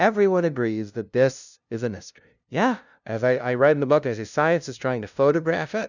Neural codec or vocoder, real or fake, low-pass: codec, 16 kHz, 0.5 kbps, FunCodec, trained on LibriTTS, 25 frames a second; fake; 7.2 kHz